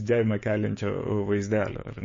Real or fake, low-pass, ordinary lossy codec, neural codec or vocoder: fake; 7.2 kHz; MP3, 32 kbps; codec, 16 kHz, 6 kbps, DAC